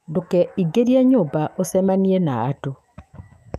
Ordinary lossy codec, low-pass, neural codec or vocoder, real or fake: none; 14.4 kHz; autoencoder, 48 kHz, 128 numbers a frame, DAC-VAE, trained on Japanese speech; fake